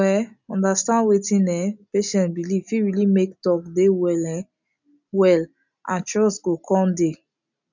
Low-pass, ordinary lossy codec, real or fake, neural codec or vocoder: 7.2 kHz; none; real; none